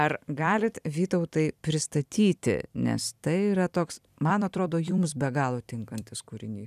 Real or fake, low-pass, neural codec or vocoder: fake; 14.4 kHz; vocoder, 44.1 kHz, 128 mel bands every 256 samples, BigVGAN v2